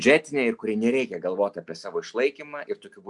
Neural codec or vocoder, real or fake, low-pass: none; real; 10.8 kHz